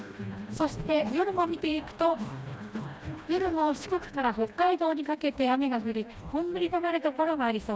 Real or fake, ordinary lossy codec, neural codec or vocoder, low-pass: fake; none; codec, 16 kHz, 1 kbps, FreqCodec, smaller model; none